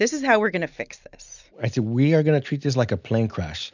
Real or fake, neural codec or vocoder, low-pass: real; none; 7.2 kHz